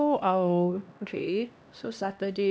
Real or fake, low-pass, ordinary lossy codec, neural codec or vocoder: fake; none; none; codec, 16 kHz, 1 kbps, X-Codec, HuBERT features, trained on LibriSpeech